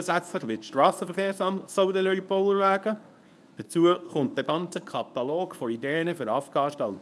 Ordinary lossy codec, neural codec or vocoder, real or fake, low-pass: none; codec, 24 kHz, 0.9 kbps, WavTokenizer, medium speech release version 1; fake; none